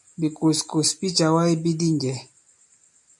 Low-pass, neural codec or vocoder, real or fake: 10.8 kHz; none; real